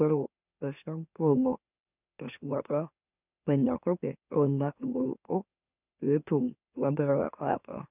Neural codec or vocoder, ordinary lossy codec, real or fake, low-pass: autoencoder, 44.1 kHz, a latent of 192 numbers a frame, MeloTTS; none; fake; 3.6 kHz